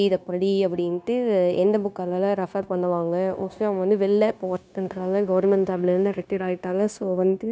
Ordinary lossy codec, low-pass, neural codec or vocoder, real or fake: none; none; codec, 16 kHz, 0.9 kbps, LongCat-Audio-Codec; fake